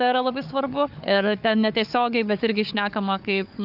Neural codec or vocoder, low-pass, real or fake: codec, 16 kHz, 4 kbps, FunCodec, trained on Chinese and English, 50 frames a second; 5.4 kHz; fake